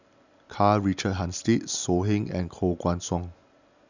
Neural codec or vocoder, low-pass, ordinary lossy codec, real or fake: none; 7.2 kHz; none; real